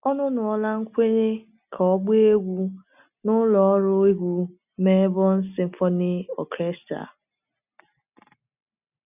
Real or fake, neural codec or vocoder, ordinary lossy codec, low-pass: real; none; none; 3.6 kHz